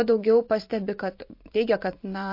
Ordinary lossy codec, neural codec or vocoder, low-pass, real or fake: MP3, 32 kbps; vocoder, 44.1 kHz, 128 mel bands every 512 samples, BigVGAN v2; 5.4 kHz; fake